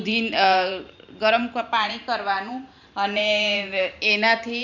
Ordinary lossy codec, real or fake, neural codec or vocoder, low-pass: none; fake; vocoder, 44.1 kHz, 128 mel bands every 512 samples, BigVGAN v2; 7.2 kHz